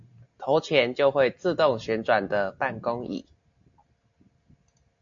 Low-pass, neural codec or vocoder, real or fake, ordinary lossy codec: 7.2 kHz; none; real; AAC, 64 kbps